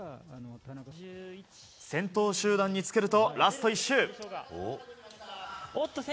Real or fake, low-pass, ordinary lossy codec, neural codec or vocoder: real; none; none; none